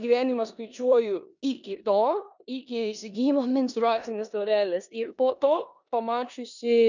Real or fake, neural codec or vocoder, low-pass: fake; codec, 16 kHz in and 24 kHz out, 0.9 kbps, LongCat-Audio-Codec, four codebook decoder; 7.2 kHz